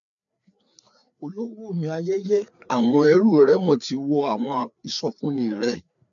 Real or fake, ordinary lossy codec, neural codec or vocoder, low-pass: fake; none; codec, 16 kHz, 4 kbps, FreqCodec, larger model; 7.2 kHz